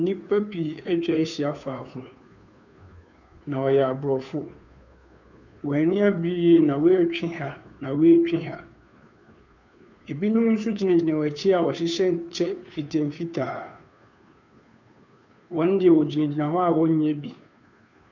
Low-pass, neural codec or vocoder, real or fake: 7.2 kHz; codec, 16 kHz, 2 kbps, FunCodec, trained on Chinese and English, 25 frames a second; fake